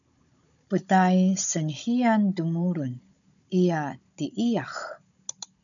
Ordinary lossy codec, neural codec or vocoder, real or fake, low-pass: MP3, 96 kbps; codec, 16 kHz, 16 kbps, FunCodec, trained on Chinese and English, 50 frames a second; fake; 7.2 kHz